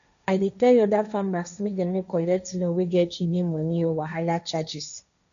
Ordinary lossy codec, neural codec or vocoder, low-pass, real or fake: none; codec, 16 kHz, 1.1 kbps, Voila-Tokenizer; 7.2 kHz; fake